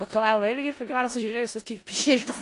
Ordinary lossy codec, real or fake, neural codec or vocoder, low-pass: AAC, 48 kbps; fake; codec, 16 kHz in and 24 kHz out, 0.4 kbps, LongCat-Audio-Codec, four codebook decoder; 10.8 kHz